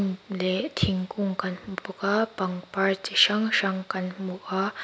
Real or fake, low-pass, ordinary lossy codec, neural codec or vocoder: real; none; none; none